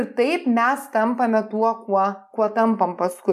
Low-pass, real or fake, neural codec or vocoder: 14.4 kHz; real; none